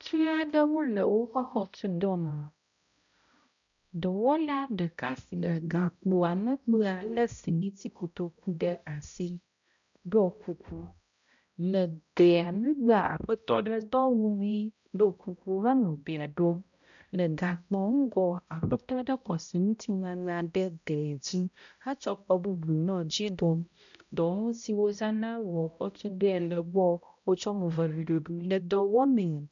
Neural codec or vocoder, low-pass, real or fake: codec, 16 kHz, 0.5 kbps, X-Codec, HuBERT features, trained on balanced general audio; 7.2 kHz; fake